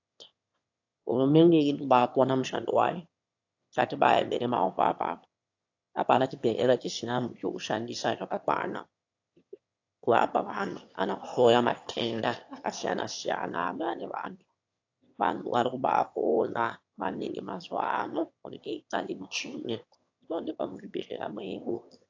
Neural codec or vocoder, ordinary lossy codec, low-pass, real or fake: autoencoder, 22.05 kHz, a latent of 192 numbers a frame, VITS, trained on one speaker; AAC, 48 kbps; 7.2 kHz; fake